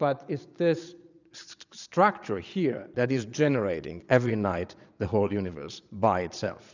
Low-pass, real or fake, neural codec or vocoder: 7.2 kHz; fake; vocoder, 22.05 kHz, 80 mel bands, Vocos